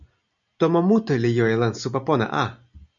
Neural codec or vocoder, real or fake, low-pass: none; real; 7.2 kHz